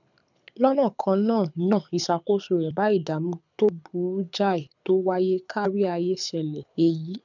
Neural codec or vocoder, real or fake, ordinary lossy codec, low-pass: codec, 24 kHz, 6 kbps, HILCodec; fake; none; 7.2 kHz